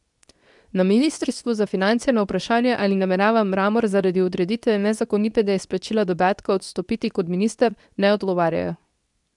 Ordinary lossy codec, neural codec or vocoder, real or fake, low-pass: none; codec, 24 kHz, 0.9 kbps, WavTokenizer, medium speech release version 2; fake; 10.8 kHz